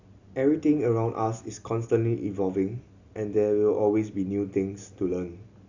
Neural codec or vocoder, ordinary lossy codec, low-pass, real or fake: none; Opus, 64 kbps; 7.2 kHz; real